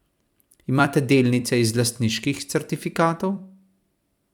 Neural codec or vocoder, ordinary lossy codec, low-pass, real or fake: vocoder, 44.1 kHz, 128 mel bands every 256 samples, BigVGAN v2; none; 19.8 kHz; fake